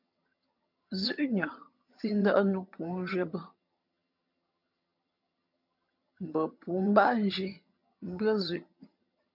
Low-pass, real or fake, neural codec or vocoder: 5.4 kHz; fake; vocoder, 22.05 kHz, 80 mel bands, HiFi-GAN